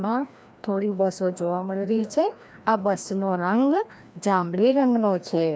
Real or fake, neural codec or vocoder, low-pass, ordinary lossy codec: fake; codec, 16 kHz, 1 kbps, FreqCodec, larger model; none; none